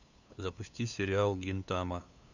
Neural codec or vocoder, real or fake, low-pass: codec, 16 kHz, 2 kbps, FunCodec, trained on LibriTTS, 25 frames a second; fake; 7.2 kHz